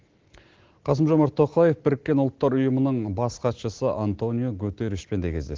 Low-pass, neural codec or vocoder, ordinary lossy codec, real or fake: 7.2 kHz; none; Opus, 16 kbps; real